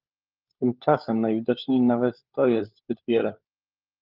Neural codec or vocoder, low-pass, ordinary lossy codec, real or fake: codec, 16 kHz, 16 kbps, FunCodec, trained on LibriTTS, 50 frames a second; 5.4 kHz; Opus, 32 kbps; fake